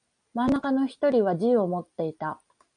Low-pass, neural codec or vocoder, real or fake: 9.9 kHz; none; real